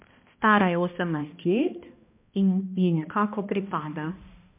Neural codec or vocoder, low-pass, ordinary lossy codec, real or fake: codec, 16 kHz, 1 kbps, X-Codec, HuBERT features, trained on balanced general audio; 3.6 kHz; MP3, 32 kbps; fake